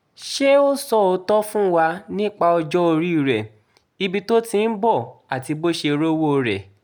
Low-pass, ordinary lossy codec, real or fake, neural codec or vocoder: none; none; real; none